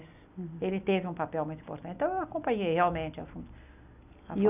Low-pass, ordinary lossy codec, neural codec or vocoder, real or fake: 3.6 kHz; none; none; real